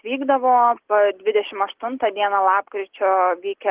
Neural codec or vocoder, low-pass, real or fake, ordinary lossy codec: none; 3.6 kHz; real; Opus, 16 kbps